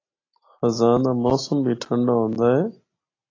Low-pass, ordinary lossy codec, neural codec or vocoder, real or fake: 7.2 kHz; AAC, 32 kbps; none; real